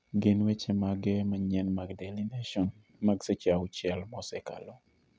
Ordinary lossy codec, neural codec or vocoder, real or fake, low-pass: none; none; real; none